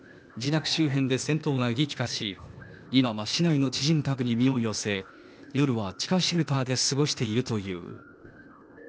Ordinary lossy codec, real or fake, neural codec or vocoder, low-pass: none; fake; codec, 16 kHz, 0.8 kbps, ZipCodec; none